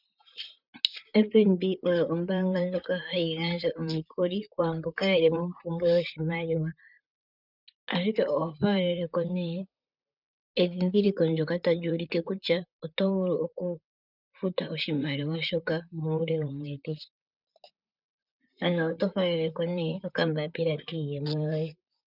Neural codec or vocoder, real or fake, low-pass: vocoder, 44.1 kHz, 128 mel bands, Pupu-Vocoder; fake; 5.4 kHz